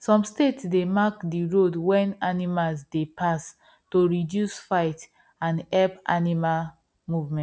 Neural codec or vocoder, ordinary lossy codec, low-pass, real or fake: none; none; none; real